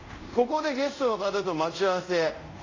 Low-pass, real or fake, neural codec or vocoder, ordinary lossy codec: 7.2 kHz; fake; codec, 24 kHz, 0.5 kbps, DualCodec; AAC, 32 kbps